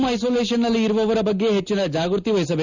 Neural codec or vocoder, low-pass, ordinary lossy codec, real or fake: none; 7.2 kHz; none; real